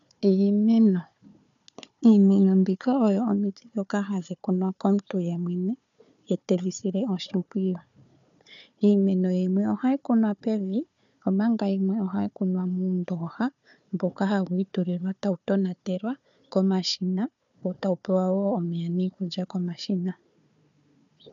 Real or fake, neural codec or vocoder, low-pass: fake; codec, 16 kHz, 4 kbps, FunCodec, trained on Chinese and English, 50 frames a second; 7.2 kHz